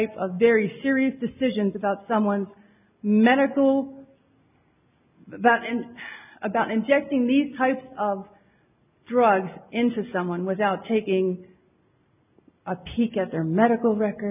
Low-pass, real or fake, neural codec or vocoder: 3.6 kHz; real; none